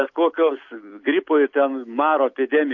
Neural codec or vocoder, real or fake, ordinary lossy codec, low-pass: none; real; AAC, 48 kbps; 7.2 kHz